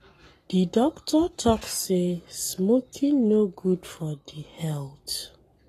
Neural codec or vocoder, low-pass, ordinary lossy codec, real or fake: codec, 44.1 kHz, 7.8 kbps, Pupu-Codec; 14.4 kHz; AAC, 48 kbps; fake